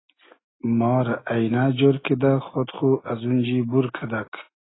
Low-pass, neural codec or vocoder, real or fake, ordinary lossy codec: 7.2 kHz; none; real; AAC, 16 kbps